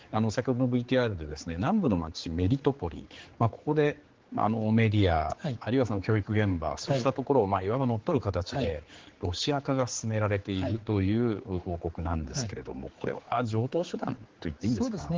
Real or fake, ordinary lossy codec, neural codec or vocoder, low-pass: fake; Opus, 16 kbps; codec, 16 kHz, 4 kbps, X-Codec, HuBERT features, trained on general audio; 7.2 kHz